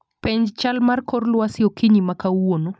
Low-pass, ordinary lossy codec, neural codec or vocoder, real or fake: none; none; none; real